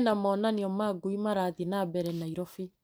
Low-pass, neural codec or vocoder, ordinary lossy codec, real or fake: none; vocoder, 44.1 kHz, 128 mel bands every 256 samples, BigVGAN v2; none; fake